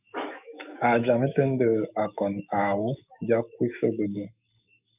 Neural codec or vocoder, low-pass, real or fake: autoencoder, 48 kHz, 128 numbers a frame, DAC-VAE, trained on Japanese speech; 3.6 kHz; fake